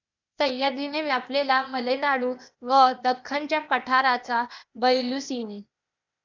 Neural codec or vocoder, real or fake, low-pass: codec, 16 kHz, 0.8 kbps, ZipCodec; fake; 7.2 kHz